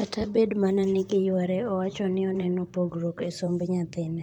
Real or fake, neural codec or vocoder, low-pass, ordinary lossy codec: fake; vocoder, 44.1 kHz, 128 mel bands, Pupu-Vocoder; 19.8 kHz; none